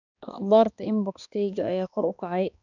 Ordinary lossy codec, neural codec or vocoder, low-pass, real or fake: none; codec, 16 kHz, 2 kbps, X-Codec, HuBERT features, trained on balanced general audio; 7.2 kHz; fake